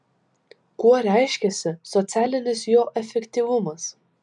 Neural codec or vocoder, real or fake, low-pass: none; real; 10.8 kHz